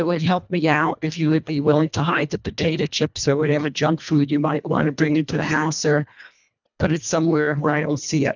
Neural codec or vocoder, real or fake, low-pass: codec, 24 kHz, 1.5 kbps, HILCodec; fake; 7.2 kHz